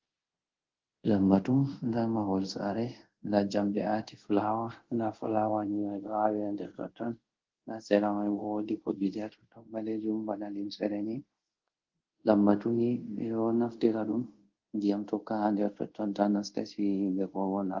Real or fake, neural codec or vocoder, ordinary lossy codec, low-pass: fake; codec, 24 kHz, 0.5 kbps, DualCodec; Opus, 16 kbps; 7.2 kHz